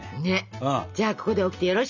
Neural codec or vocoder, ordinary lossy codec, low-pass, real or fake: none; none; 7.2 kHz; real